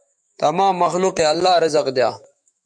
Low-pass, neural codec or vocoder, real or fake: 9.9 kHz; autoencoder, 48 kHz, 128 numbers a frame, DAC-VAE, trained on Japanese speech; fake